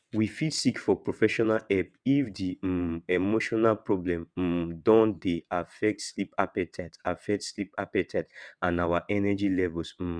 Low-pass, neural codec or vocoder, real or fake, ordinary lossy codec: 9.9 kHz; vocoder, 22.05 kHz, 80 mel bands, WaveNeXt; fake; none